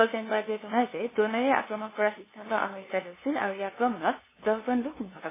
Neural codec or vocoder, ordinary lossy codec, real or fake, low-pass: codec, 16 kHz, 2 kbps, FunCodec, trained on LibriTTS, 25 frames a second; MP3, 16 kbps; fake; 3.6 kHz